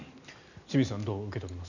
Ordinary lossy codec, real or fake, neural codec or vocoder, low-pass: none; real; none; 7.2 kHz